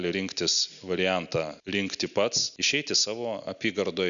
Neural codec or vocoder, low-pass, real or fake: none; 7.2 kHz; real